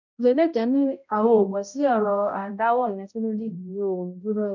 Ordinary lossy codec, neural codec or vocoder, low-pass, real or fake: none; codec, 16 kHz, 0.5 kbps, X-Codec, HuBERT features, trained on balanced general audio; 7.2 kHz; fake